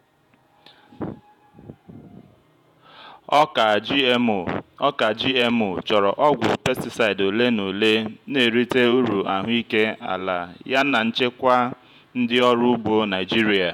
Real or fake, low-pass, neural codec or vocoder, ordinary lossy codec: fake; 19.8 kHz; vocoder, 48 kHz, 128 mel bands, Vocos; none